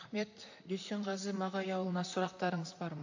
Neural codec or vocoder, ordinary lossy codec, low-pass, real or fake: vocoder, 44.1 kHz, 128 mel bands, Pupu-Vocoder; AAC, 48 kbps; 7.2 kHz; fake